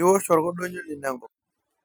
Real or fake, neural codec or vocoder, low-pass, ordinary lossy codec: real; none; none; none